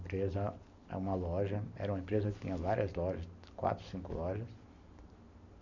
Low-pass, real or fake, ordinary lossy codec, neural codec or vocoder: 7.2 kHz; real; none; none